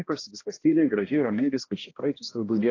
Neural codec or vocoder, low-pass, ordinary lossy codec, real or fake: codec, 16 kHz, 1 kbps, X-Codec, HuBERT features, trained on balanced general audio; 7.2 kHz; AAC, 32 kbps; fake